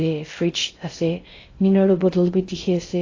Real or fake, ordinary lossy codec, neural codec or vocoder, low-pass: fake; AAC, 32 kbps; codec, 16 kHz in and 24 kHz out, 0.6 kbps, FocalCodec, streaming, 2048 codes; 7.2 kHz